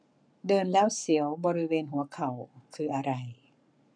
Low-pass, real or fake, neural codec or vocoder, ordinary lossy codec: 9.9 kHz; fake; codec, 44.1 kHz, 7.8 kbps, Pupu-Codec; none